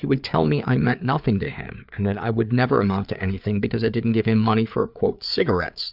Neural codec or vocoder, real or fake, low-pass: codec, 16 kHz, 4 kbps, FreqCodec, larger model; fake; 5.4 kHz